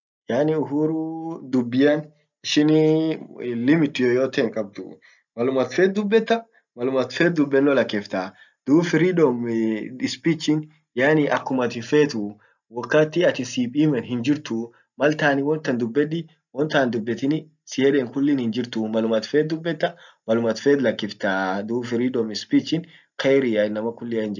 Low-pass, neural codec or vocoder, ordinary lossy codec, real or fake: 7.2 kHz; none; none; real